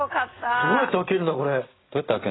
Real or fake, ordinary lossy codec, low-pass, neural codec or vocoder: real; AAC, 16 kbps; 7.2 kHz; none